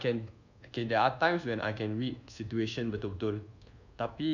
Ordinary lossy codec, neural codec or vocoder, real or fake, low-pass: none; codec, 24 kHz, 1.2 kbps, DualCodec; fake; 7.2 kHz